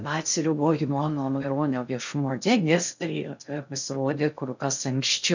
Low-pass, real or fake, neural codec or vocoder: 7.2 kHz; fake; codec, 16 kHz in and 24 kHz out, 0.6 kbps, FocalCodec, streaming, 2048 codes